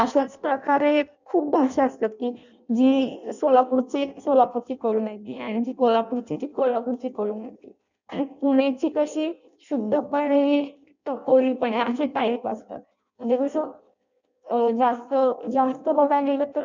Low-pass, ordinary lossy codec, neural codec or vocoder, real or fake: 7.2 kHz; none; codec, 16 kHz in and 24 kHz out, 0.6 kbps, FireRedTTS-2 codec; fake